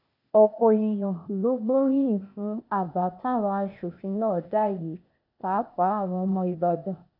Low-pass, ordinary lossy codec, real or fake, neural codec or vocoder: 5.4 kHz; none; fake; codec, 16 kHz, 0.8 kbps, ZipCodec